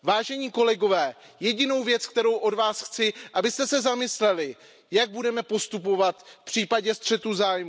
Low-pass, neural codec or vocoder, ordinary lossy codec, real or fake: none; none; none; real